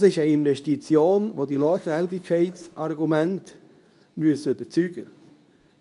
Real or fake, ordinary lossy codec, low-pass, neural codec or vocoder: fake; none; 10.8 kHz; codec, 24 kHz, 0.9 kbps, WavTokenizer, medium speech release version 2